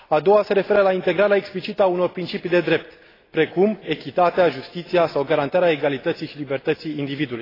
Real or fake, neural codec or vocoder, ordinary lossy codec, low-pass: real; none; AAC, 24 kbps; 5.4 kHz